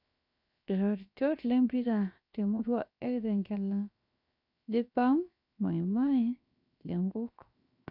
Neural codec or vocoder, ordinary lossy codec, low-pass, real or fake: codec, 16 kHz, 0.7 kbps, FocalCodec; none; 5.4 kHz; fake